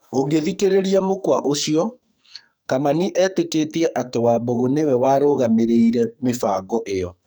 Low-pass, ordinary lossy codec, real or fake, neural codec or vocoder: none; none; fake; codec, 44.1 kHz, 2.6 kbps, SNAC